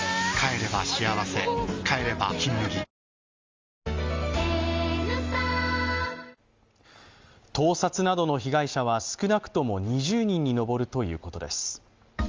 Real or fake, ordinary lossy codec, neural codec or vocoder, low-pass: real; Opus, 32 kbps; none; 7.2 kHz